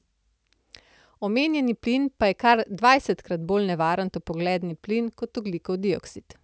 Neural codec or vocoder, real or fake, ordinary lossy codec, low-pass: none; real; none; none